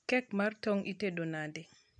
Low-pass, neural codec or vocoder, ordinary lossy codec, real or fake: 9.9 kHz; none; none; real